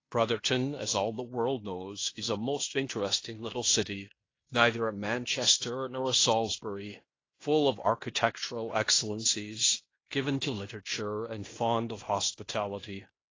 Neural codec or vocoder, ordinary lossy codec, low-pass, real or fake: codec, 16 kHz in and 24 kHz out, 0.9 kbps, LongCat-Audio-Codec, fine tuned four codebook decoder; AAC, 32 kbps; 7.2 kHz; fake